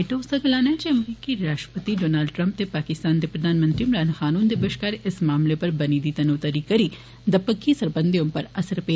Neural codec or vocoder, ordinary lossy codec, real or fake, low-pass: none; none; real; none